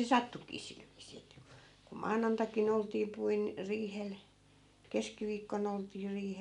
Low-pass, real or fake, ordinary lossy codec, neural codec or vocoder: 10.8 kHz; real; none; none